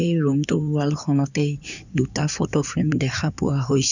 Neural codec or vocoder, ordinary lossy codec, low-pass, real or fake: codec, 16 kHz in and 24 kHz out, 2.2 kbps, FireRedTTS-2 codec; none; 7.2 kHz; fake